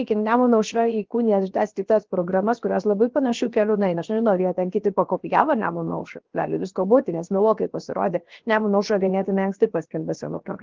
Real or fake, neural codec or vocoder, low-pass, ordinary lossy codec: fake; codec, 16 kHz, 0.7 kbps, FocalCodec; 7.2 kHz; Opus, 16 kbps